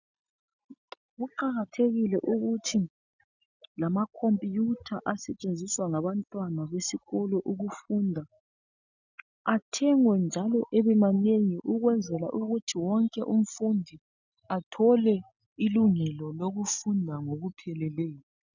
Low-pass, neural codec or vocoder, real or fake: 7.2 kHz; none; real